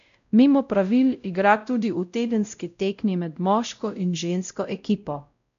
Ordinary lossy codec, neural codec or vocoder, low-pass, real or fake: none; codec, 16 kHz, 0.5 kbps, X-Codec, WavLM features, trained on Multilingual LibriSpeech; 7.2 kHz; fake